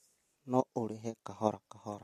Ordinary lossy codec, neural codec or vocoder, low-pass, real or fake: MP3, 64 kbps; none; 14.4 kHz; real